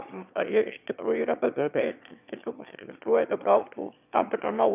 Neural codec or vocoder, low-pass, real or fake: autoencoder, 22.05 kHz, a latent of 192 numbers a frame, VITS, trained on one speaker; 3.6 kHz; fake